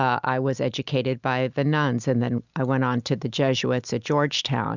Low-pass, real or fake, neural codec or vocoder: 7.2 kHz; real; none